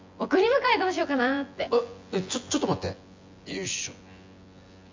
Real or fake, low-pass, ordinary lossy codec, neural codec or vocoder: fake; 7.2 kHz; MP3, 64 kbps; vocoder, 24 kHz, 100 mel bands, Vocos